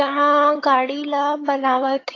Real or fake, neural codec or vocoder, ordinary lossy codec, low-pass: fake; vocoder, 22.05 kHz, 80 mel bands, HiFi-GAN; AAC, 32 kbps; 7.2 kHz